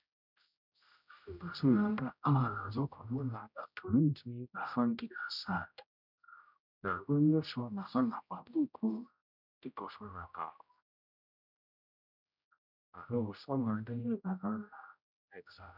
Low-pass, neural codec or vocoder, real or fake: 5.4 kHz; codec, 16 kHz, 0.5 kbps, X-Codec, HuBERT features, trained on general audio; fake